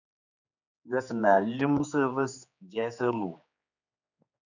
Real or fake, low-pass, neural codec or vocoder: fake; 7.2 kHz; codec, 16 kHz, 4 kbps, X-Codec, HuBERT features, trained on general audio